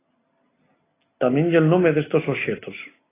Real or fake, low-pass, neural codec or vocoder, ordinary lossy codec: real; 3.6 kHz; none; AAC, 16 kbps